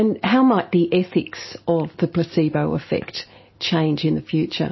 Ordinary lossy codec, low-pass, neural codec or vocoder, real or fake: MP3, 24 kbps; 7.2 kHz; none; real